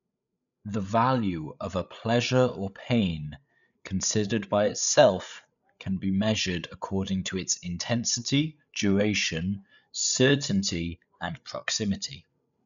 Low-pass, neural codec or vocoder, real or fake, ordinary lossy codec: 7.2 kHz; codec, 16 kHz, 8 kbps, FreqCodec, larger model; fake; none